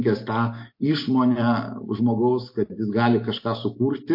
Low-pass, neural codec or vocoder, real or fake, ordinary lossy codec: 5.4 kHz; none; real; MP3, 32 kbps